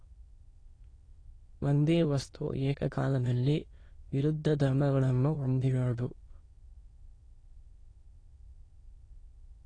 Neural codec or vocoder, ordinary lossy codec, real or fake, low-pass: autoencoder, 22.05 kHz, a latent of 192 numbers a frame, VITS, trained on many speakers; AAC, 32 kbps; fake; 9.9 kHz